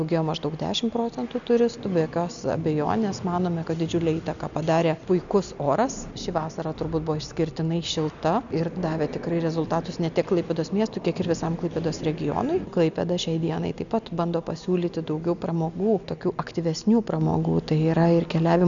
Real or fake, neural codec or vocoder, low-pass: real; none; 7.2 kHz